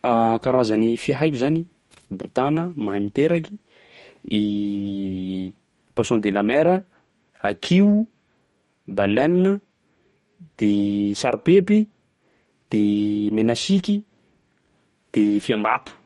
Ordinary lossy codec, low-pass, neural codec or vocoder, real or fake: MP3, 48 kbps; 19.8 kHz; codec, 44.1 kHz, 2.6 kbps, DAC; fake